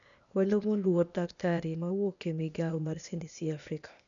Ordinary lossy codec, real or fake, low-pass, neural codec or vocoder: none; fake; 7.2 kHz; codec, 16 kHz, 0.8 kbps, ZipCodec